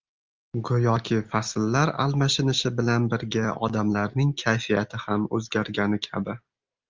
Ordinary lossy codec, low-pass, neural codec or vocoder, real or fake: Opus, 32 kbps; 7.2 kHz; none; real